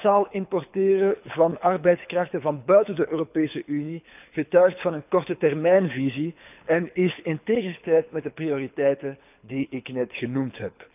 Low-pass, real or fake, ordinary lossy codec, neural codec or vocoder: 3.6 kHz; fake; none; codec, 24 kHz, 6 kbps, HILCodec